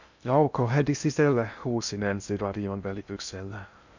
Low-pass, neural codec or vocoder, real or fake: 7.2 kHz; codec, 16 kHz in and 24 kHz out, 0.6 kbps, FocalCodec, streaming, 2048 codes; fake